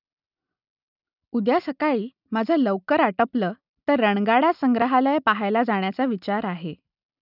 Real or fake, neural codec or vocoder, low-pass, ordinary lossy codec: real; none; 5.4 kHz; none